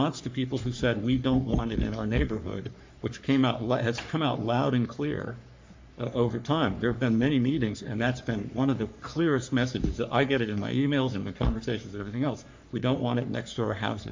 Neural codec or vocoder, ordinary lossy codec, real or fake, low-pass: codec, 44.1 kHz, 3.4 kbps, Pupu-Codec; MP3, 48 kbps; fake; 7.2 kHz